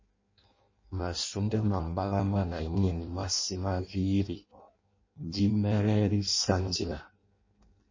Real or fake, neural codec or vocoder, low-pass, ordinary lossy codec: fake; codec, 16 kHz in and 24 kHz out, 0.6 kbps, FireRedTTS-2 codec; 7.2 kHz; MP3, 32 kbps